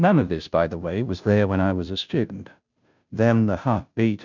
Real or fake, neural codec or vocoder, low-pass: fake; codec, 16 kHz, 0.5 kbps, FunCodec, trained on Chinese and English, 25 frames a second; 7.2 kHz